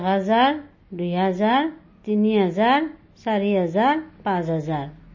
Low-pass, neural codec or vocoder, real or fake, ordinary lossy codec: 7.2 kHz; none; real; MP3, 32 kbps